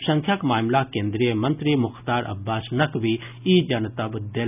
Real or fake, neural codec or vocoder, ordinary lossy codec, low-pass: real; none; none; 3.6 kHz